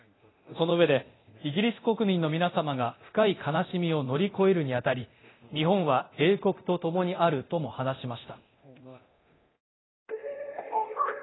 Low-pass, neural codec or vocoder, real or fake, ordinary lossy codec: 7.2 kHz; codec, 24 kHz, 0.9 kbps, DualCodec; fake; AAC, 16 kbps